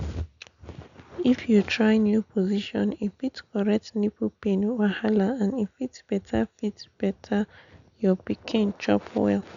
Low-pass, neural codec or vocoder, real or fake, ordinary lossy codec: 7.2 kHz; none; real; none